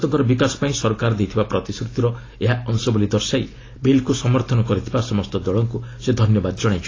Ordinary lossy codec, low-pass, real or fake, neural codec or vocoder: AAC, 32 kbps; 7.2 kHz; real; none